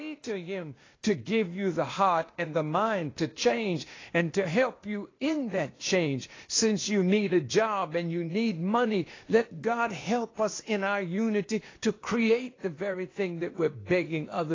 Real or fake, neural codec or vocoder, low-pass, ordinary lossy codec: fake; codec, 16 kHz, 0.8 kbps, ZipCodec; 7.2 kHz; AAC, 32 kbps